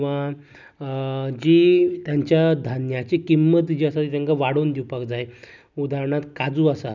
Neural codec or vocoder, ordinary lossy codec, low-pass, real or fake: none; none; 7.2 kHz; real